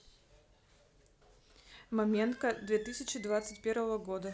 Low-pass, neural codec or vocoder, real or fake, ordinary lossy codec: none; none; real; none